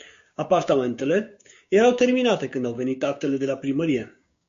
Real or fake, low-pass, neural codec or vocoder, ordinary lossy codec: fake; 7.2 kHz; codec, 16 kHz, 6 kbps, DAC; MP3, 48 kbps